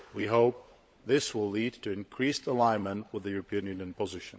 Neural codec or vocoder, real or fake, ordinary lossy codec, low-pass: codec, 16 kHz, 16 kbps, FunCodec, trained on LibriTTS, 50 frames a second; fake; none; none